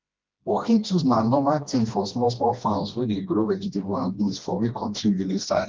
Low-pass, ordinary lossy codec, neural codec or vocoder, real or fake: 7.2 kHz; Opus, 32 kbps; codec, 16 kHz, 1 kbps, FreqCodec, smaller model; fake